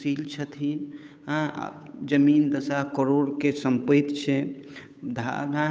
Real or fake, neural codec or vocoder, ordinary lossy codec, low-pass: fake; codec, 16 kHz, 8 kbps, FunCodec, trained on Chinese and English, 25 frames a second; none; none